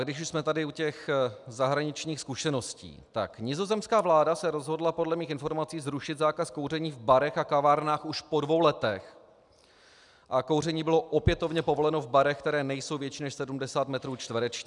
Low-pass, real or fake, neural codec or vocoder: 10.8 kHz; real; none